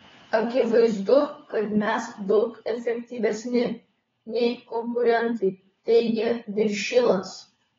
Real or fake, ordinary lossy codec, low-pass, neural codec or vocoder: fake; AAC, 24 kbps; 7.2 kHz; codec, 16 kHz, 4 kbps, FunCodec, trained on LibriTTS, 50 frames a second